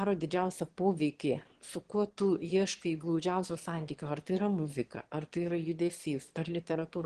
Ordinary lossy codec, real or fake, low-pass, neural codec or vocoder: Opus, 16 kbps; fake; 9.9 kHz; autoencoder, 22.05 kHz, a latent of 192 numbers a frame, VITS, trained on one speaker